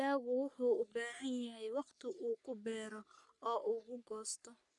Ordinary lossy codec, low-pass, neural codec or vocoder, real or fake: none; 10.8 kHz; codec, 44.1 kHz, 3.4 kbps, Pupu-Codec; fake